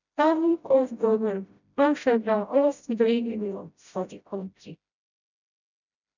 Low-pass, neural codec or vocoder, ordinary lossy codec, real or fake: 7.2 kHz; codec, 16 kHz, 0.5 kbps, FreqCodec, smaller model; none; fake